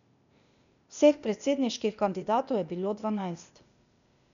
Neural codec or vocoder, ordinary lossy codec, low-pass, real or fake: codec, 16 kHz, 0.8 kbps, ZipCodec; MP3, 96 kbps; 7.2 kHz; fake